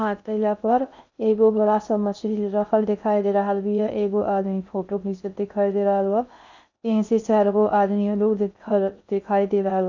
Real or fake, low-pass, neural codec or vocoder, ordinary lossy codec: fake; 7.2 kHz; codec, 16 kHz in and 24 kHz out, 0.6 kbps, FocalCodec, streaming, 2048 codes; none